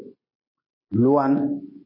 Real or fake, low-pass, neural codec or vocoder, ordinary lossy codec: real; 5.4 kHz; none; MP3, 24 kbps